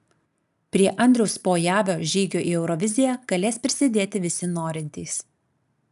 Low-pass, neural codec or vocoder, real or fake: 14.4 kHz; none; real